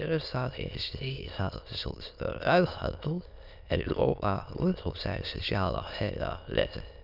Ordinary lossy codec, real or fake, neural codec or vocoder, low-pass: none; fake; autoencoder, 22.05 kHz, a latent of 192 numbers a frame, VITS, trained on many speakers; 5.4 kHz